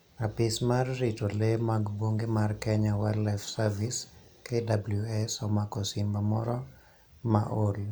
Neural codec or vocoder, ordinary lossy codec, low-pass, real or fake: none; none; none; real